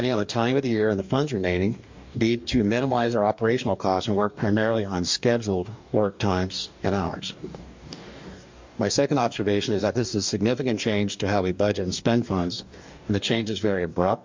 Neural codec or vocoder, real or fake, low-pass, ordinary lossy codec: codec, 44.1 kHz, 2.6 kbps, DAC; fake; 7.2 kHz; MP3, 48 kbps